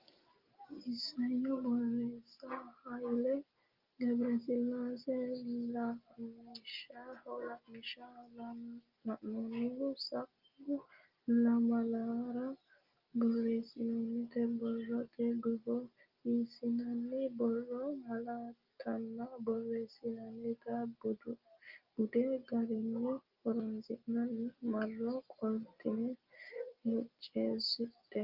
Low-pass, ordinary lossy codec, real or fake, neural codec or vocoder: 5.4 kHz; Opus, 32 kbps; real; none